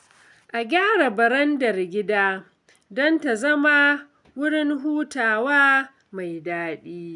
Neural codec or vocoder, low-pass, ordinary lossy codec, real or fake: none; 10.8 kHz; none; real